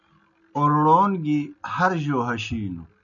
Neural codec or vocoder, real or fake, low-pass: none; real; 7.2 kHz